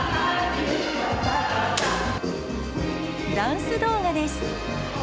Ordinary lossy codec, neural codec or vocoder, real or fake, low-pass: none; none; real; none